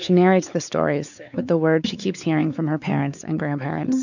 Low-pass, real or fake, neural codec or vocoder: 7.2 kHz; fake; codec, 16 kHz, 4 kbps, X-Codec, WavLM features, trained on Multilingual LibriSpeech